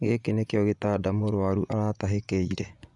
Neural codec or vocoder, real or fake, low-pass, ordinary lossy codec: vocoder, 24 kHz, 100 mel bands, Vocos; fake; 10.8 kHz; none